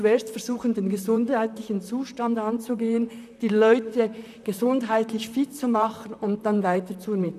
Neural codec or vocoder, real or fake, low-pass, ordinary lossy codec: vocoder, 44.1 kHz, 128 mel bands, Pupu-Vocoder; fake; 14.4 kHz; none